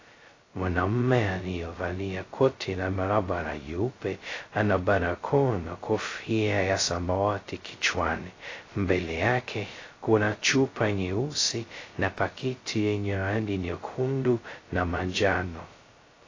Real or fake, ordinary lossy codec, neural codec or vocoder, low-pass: fake; AAC, 32 kbps; codec, 16 kHz, 0.2 kbps, FocalCodec; 7.2 kHz